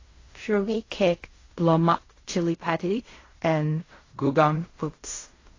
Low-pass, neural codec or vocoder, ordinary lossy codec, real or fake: 7.2 kHz; codec, 16 kHz in and 24 kHz out, 0.4 kbps, LongCat-Audio-Codec, fine tuned four codebook decoder; AAC, 32 kbps; fake